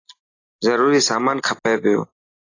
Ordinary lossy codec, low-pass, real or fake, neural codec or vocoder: AAC, 32 kbps; 7.2 kHz; real; none